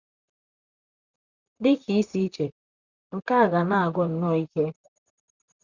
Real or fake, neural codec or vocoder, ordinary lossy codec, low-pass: fake; vocoder, 44.1 kHz, 128 mel bands, Pupu-Vocoder; Opus, 64 kbps; 7.2 kHz